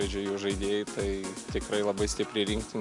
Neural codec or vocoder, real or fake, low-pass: none; real; 10.8 kHz